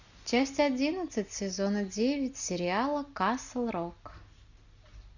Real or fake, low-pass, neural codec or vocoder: real; 7.2 kHz; none